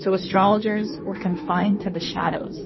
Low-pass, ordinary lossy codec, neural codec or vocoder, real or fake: 7.2 kHz; MP3, 24 kbps; codec, 16 kHz in and 24 kHz out, 1.1 kbps, FireRedTTS-2 codec; fake